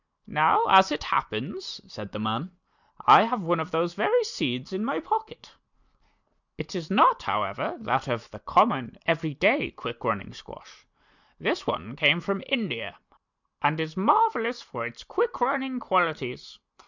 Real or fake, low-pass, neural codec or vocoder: real; 7.2 kHz; none